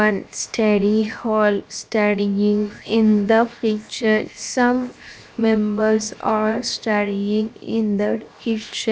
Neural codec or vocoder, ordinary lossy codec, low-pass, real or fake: codec, 16 kHz, 0.7 kbps, FocalCodec; none; none; fake